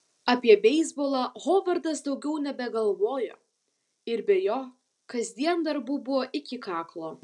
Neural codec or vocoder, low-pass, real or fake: none; 10.8 kHz; real